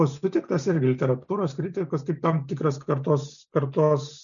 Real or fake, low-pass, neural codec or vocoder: real; 7.2 kHz; none